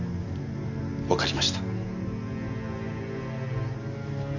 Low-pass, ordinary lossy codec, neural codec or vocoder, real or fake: 7.2 kHz; none; none; real